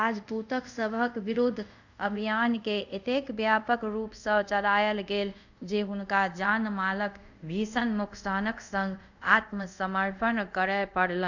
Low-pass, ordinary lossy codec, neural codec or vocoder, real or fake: 7.2 kHz; none; codec, 24 kHz, 0.5 kbps, DualCodec; fake